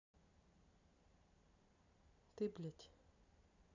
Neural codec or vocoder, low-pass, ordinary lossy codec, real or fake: none; 7.2 kHz; none; real